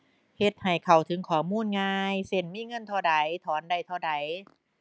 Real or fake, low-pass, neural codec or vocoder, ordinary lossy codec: real; none; none; none